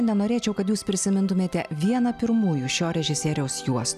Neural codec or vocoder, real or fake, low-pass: none; real; 14.4 kHz